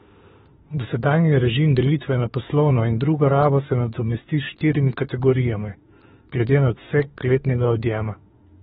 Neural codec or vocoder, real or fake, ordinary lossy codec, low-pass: autoencoder, 48 kHz, 32 numbers a frame, DAC-VAE, trained on Japanese speech; fake; AAC, 16 kbps; 19.8 kHz